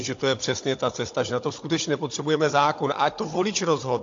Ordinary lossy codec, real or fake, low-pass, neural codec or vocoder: AAC, 48 kbps; fake; 7.2 kHz; codec, 16 kHz, 16 kbps, FunCodec, trained on Chinese and English, 50 frames a second